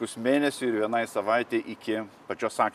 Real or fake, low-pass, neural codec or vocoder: real; 14.4 kHz; none